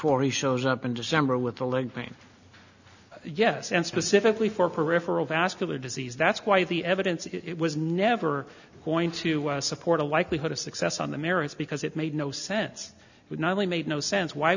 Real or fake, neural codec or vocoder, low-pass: real; none; 7.2 kHz